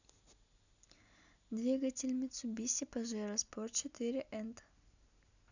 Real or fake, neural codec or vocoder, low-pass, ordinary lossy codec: real; none; 7.2 kHz; none